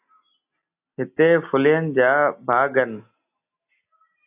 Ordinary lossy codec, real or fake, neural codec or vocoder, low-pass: AAC, 32 kbps; real; none; 3.6 kHz